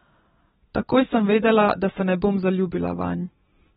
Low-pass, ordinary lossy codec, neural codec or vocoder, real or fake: 10.8 kHz; AAC, 16 kbps; none; real